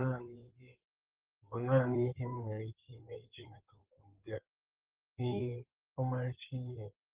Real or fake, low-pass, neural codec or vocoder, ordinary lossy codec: fake; 3.6 kHz; codec, 16 kHz in and 24 kHz out, 2.2 kbps, FireRedTTS-2 codec; Opus, 16 kbps